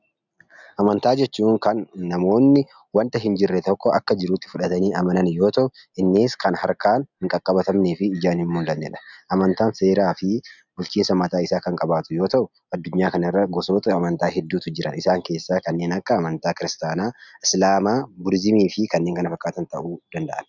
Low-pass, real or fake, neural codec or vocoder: 7.2 kHz; real; none